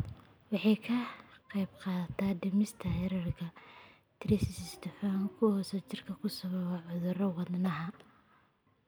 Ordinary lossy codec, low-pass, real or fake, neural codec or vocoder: none; none; real; none